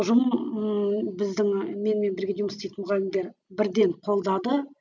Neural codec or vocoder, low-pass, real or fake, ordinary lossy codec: none; 7.2 kHz; real; none